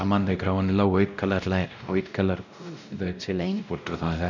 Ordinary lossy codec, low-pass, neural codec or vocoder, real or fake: none; 7.2 kHz; codec, 16 kHz, 0.5 kbps, X-Codec, WavLM features, trained on Multilingual LibriSpeech; fake